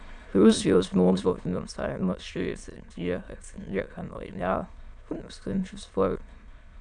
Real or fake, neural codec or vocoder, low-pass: fake; autoencoder, 22.05 kHz, a latent of 192 numbers a frame, VITS, trained on many speakers; 9.9 kHz